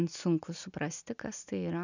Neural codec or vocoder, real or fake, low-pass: none; real; 7.2 kHz